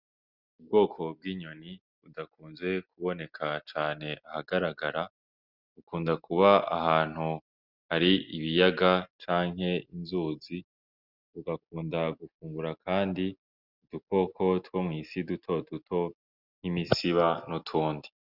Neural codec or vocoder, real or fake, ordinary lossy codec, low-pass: none; real; Opus, 64 kbps; 5.4 kHz